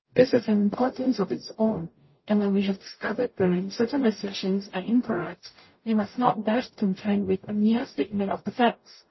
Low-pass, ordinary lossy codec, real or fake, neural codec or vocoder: 7.2 kHz; MP3, 24 kbps; fake; codec, 44.1 kHz, 0.9 kbps, DAC